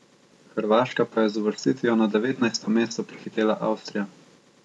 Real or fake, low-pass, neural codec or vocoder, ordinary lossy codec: real; none; none; none